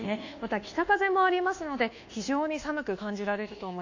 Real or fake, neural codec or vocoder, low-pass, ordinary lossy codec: fake; codec, 24 kHz, 1.2 kbps, DualCodec; 7.2 kHz; AAC, 48 kbps